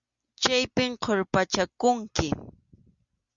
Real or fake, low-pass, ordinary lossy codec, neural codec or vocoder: real; 7.2 kHz; Opus, 64 kbps; none